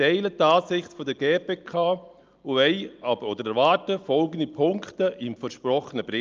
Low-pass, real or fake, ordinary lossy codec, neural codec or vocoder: 7.2 kHz; real; Opus, 24 kbps; none